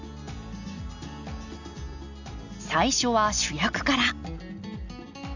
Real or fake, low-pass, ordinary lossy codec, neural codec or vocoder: real; 7.2 kHz; none; none